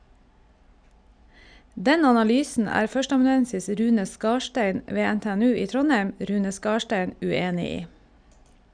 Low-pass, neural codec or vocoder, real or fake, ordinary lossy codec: 9.9 kHz; none; real; none